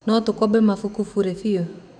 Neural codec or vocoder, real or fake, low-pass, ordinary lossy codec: autoencoder, 48 kHz, 128 numbers a frame, DAC-VAE, trained on Japanese speech; fake; 9.9 kHz; none